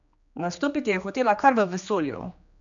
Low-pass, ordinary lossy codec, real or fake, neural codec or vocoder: 7.2 kHz; none; fake; codec, 16 kHz, 2 kbps, X-Codec, HuBERT features, trained on general audio